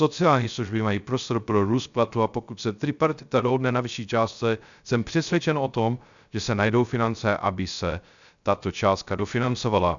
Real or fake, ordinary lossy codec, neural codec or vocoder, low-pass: fake; MP3, 96 kbps; codec, 16 kHz, 0.3 kbps, FocalCodec; 7.2 kHz